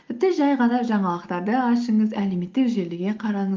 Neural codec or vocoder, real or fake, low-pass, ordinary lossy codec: none; real; 7.2 kHz; Opus, 32 kbps